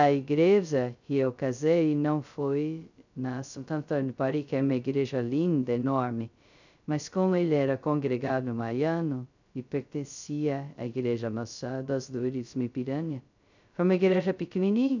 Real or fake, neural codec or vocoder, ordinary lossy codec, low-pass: fake; codec, 16 kHz, 0.2 kbps, FocalCodec; none; 7.2 kHz